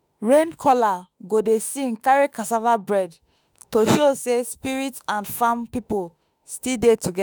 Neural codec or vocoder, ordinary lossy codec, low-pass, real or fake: autoencoder, 48 kHz, 32 numbers a frame, DAC-VAE, trained on Japanese speech; none; none; fake